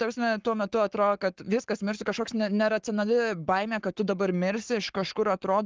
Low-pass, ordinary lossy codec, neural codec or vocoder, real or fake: 7.2 kHz; Opus, 16 kbps; codec, 44.1 kHz, 7.8 kbps, Pupu-Codec; fake